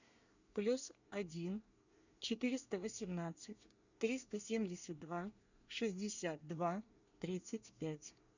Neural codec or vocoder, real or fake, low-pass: codec, 24 kHz, 1 kbps, SNAC; fake; 7.2 kHz